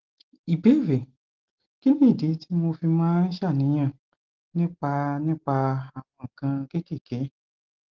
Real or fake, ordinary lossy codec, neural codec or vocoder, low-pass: real; Opus, 16 kbps; none; 7.2 kHz